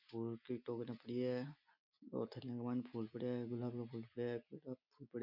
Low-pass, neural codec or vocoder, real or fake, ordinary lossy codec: 5.4 kHz; none; real; none